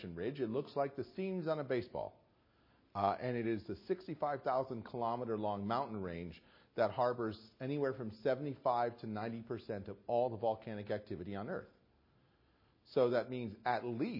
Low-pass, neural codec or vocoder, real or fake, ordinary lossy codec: 5.4 kHz; none; real; MP3, 24 kbps